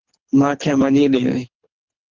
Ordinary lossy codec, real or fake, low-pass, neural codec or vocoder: Opus, 32 kbps; fake; 7.2 kHz; codec, 24 kHz, 3 kbps, HILCodec